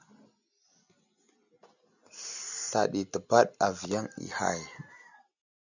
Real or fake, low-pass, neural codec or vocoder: real; 7.2 kHz; none